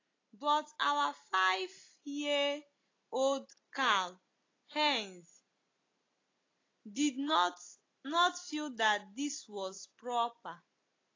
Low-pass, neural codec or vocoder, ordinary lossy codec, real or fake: 7.2 kHz; none; AAC, 32 kbps; real